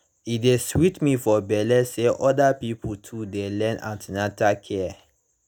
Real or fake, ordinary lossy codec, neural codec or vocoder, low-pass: real; none; none; none